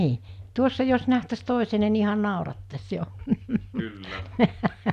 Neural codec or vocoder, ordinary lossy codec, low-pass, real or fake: none; none; 14.4 kHz; real